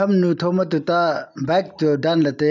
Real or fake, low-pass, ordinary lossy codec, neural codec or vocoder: real; 7.2 kHz; none; none